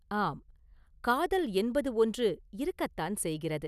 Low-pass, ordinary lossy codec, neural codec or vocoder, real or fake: 14.4 kHz; none; none; real